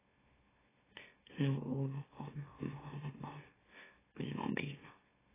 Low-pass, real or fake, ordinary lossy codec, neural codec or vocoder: 3.6 kHz; fake; AAC, 16 kbps; autoencoder, 44.1 kHz, a latent of 192 numbers a frame, MeloTTS